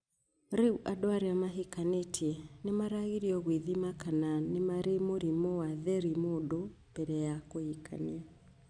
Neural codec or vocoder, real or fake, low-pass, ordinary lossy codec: none; real; 9.9 kHz; none